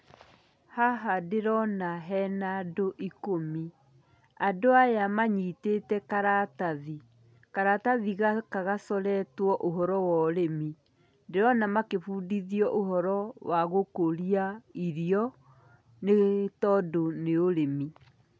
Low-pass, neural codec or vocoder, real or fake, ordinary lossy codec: none; none; real; none